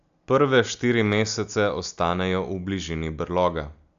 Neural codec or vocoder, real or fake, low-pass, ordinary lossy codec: none; real; 7.2 kHz; AAC, 96 kbps